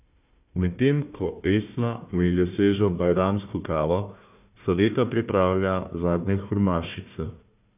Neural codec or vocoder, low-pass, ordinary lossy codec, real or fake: codec, 16 kHz, 1 kbps, FunCodec, trained on Chinese and English, 50 frames a second; 3.6 kHz; none; fake